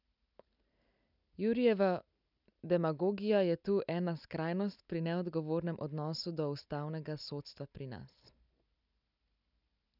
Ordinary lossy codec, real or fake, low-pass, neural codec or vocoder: none; real; 5.4 kHz; none